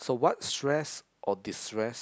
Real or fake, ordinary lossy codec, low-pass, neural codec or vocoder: real; none; none; none